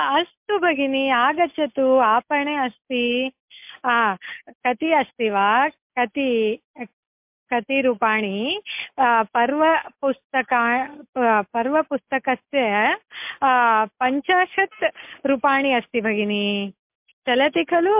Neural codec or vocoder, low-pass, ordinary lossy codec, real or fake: none; 3.6 kHz; MP3, 32 kbps; real